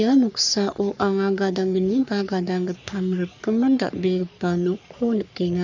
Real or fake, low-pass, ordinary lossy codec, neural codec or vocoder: fake; 7.2 kHz; none; codec, 44.1 kHz, 3.4 kbps, Pupu-Codec